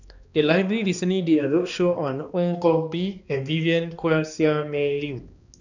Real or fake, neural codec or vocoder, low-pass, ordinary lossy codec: fake; codec, 16 kHz, 2 kbps, X-Codec, HuBERT features, trained on balanced general audio; 7.2 kHz; none